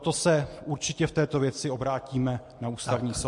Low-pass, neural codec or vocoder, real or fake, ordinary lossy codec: 10.8 kHz; none; real; MP3, 48 kbps